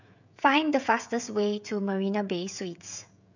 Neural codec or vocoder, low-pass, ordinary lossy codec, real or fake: codec, 16 kHz, 16 kbps, FreqCodec, smaller model; 7.2 kHz; none; fake